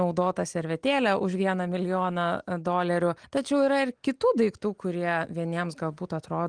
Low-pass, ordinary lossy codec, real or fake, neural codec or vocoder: 9.9 kHz; Opus, 24 kbps; real; none